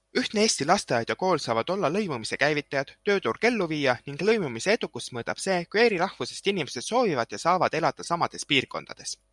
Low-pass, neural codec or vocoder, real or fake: 10.8 kHz; none; real